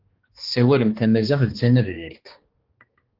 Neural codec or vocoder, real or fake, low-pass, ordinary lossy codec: codec, 16 kHz, 2 kbps, X-Codec, HuBERT features, trained on balanced general audio; fake; 5.4 kHz; Opus, 16 kbps